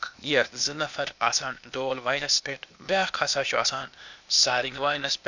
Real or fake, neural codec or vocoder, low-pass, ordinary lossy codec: fake; codec, 16 kHz, 0.8 kbps, ZipCodec; 7.2 kHz; none